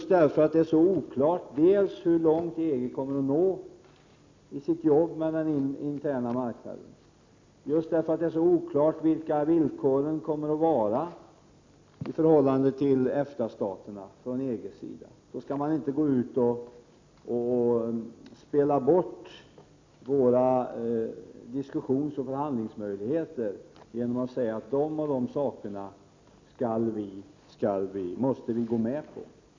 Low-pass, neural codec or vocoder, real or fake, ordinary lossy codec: 7.2 kHz; none; real; MP3, 64 kbps